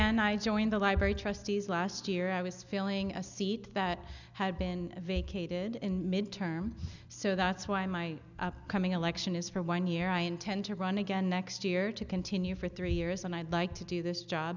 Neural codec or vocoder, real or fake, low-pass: none; real; 7.2 kHz